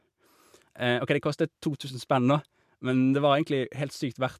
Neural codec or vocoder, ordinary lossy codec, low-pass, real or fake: none; MP3, 96 kbps; 14.4 kHz; real